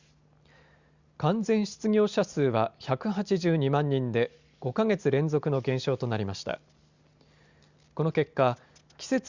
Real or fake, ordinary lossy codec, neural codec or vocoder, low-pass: real; Opus, 64 kbps; none; 7.2 kHz